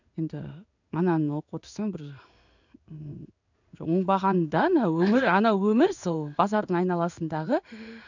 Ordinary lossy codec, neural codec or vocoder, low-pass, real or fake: none; codec, 16 kHz in and 24 kHz out, 1 kbps, XY-Tokenizer; 7.2 kHz; fake